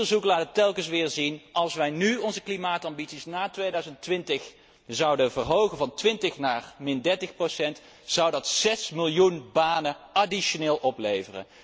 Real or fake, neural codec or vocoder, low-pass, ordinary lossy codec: real; none; none; none